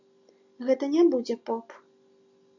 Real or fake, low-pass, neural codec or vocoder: real; 7.2 kHz; none